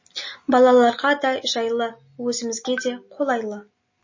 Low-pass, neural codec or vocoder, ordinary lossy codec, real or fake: 7.2 kHz; none; MP3, 32 kbps; real